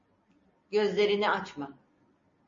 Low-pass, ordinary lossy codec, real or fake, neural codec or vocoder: 7.2 kHz; MP3, 32 kbps; real; none